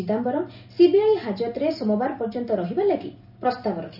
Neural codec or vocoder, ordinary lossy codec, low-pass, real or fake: none; none; 5.4 kHz; real